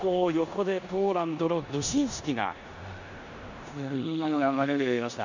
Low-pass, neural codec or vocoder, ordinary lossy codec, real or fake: 7.2 kHz; codec, 16 kHz in and 24 kHz out, 0.9 kbps, LongCat-Audio-Codec, four codebook decoder; none; fake